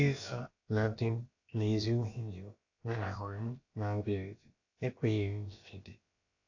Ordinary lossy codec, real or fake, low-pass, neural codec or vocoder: AAC, 48 kbps; fake; 7.2 kHz; codec, 16 kHz, about 1 kbps, DyCAST, with the encoder's durations